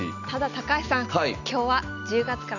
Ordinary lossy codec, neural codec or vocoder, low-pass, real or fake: none; none; 7.2 kHz; real